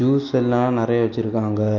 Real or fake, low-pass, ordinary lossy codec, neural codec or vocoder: real; 7.2 kHz; none; none